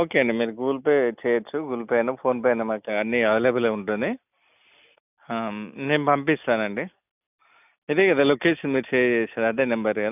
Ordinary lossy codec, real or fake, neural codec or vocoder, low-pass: none; real; none; 3.6 kHz